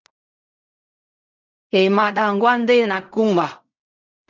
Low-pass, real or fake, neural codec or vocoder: 7.2 kHz; fake; codec, 16 kHz in and 24 kHz out, 0.4 kbps, LongCat-Audio-Codec, fine tuned four codebook decoder